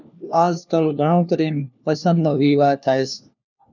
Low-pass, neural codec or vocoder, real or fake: 7.2 kHz; codec, 16 kHz, 1 kbps, FunCodec, trained on LibriTTS, 50 frames a second; fake